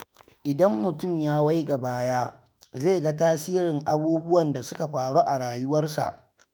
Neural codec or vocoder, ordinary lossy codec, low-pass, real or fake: autoencoder, 48 kHz, 32 numbers a frame, DAC-VAE, trained on Japanese speech; none; none; fake